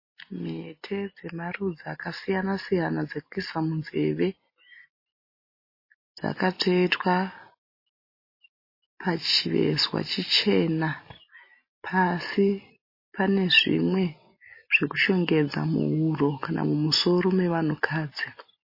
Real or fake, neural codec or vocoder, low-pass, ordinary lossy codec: real; none; 5.4 kHz; MP3, 24 kbps